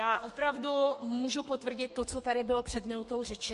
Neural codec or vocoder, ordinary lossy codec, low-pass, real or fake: codec, 32 kHz, 1.9 kbps, SNAC; MP3, 48 kbps; 14.4 kHz; fake